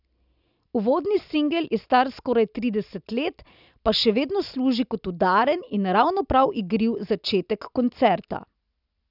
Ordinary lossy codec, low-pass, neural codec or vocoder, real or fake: none; 5.4 kHz; none; real